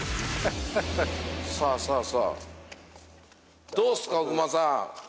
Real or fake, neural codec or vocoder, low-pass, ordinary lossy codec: real; none; none; none